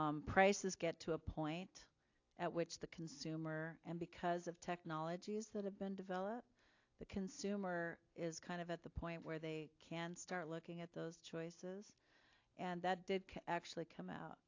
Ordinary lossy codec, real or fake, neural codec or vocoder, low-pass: AAC, 48 kbps; real; none; 7.2 kHz